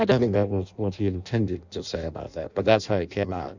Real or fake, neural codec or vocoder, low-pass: fake; codec, 16 kHz in and 24 kHz out, 0.6 kbps, FireRedTTS-2 codec; 7.2 kHz